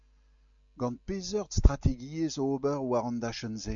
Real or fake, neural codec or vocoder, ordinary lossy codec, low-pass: real; none; AAC, 64 kbps; 7.2 kHz